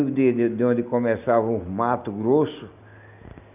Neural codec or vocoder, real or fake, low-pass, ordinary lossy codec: none; real; 3.6 kHz; none